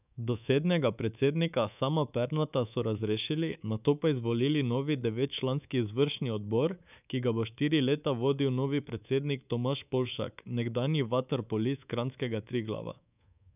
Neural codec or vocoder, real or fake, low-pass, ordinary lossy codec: codec, 24 kHz, 3.1 kbps, DualCodec; fake; 3.6 kHz; none